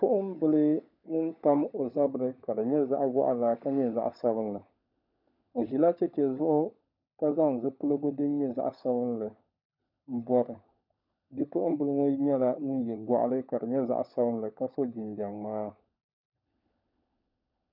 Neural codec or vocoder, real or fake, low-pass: codec, 16 kHz, 16 kbps, FunCodec, trained on LibriTTS, 50 frames a second; fake; 5.4 kHz